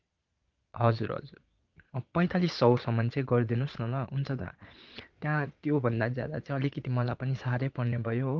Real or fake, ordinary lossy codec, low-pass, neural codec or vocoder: fake; Opus, 32 kbps; 7.2 kHz; vocoder, 22.05 kHz, 80 mel bands, Vocos